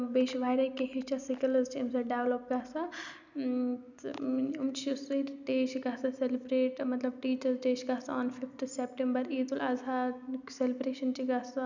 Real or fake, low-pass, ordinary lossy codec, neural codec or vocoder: real; 7.2 kHz; none; none